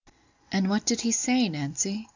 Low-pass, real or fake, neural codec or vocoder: 7.2 kHz; real; none